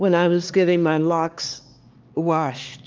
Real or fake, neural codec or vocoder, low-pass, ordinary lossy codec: fake; codec, 16 kHz, 2 kbps, X-Codec, HuBERT features, trained on LibriSpeech; 7.2 kHz; Opus, 32 kbps